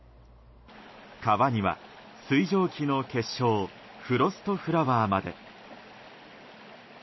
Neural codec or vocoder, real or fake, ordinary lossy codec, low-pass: none; real; MP3, 24 kbps; 7.2 kHz